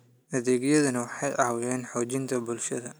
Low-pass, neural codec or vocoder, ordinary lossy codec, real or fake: none; none; none; real